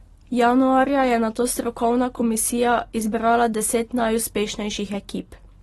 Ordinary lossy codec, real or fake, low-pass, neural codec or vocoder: AAC, 32 kbps; real; 19.8 kHz; none